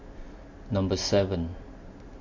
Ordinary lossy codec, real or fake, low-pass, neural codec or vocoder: MP3, 48 kbps; real; 7.2 kHz; none